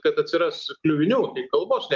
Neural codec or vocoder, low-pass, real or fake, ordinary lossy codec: none; 7.2 kHz; real; Opus, 32 kbps